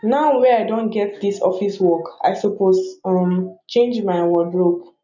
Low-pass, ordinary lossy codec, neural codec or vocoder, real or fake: 7.2 kHz; none; none; real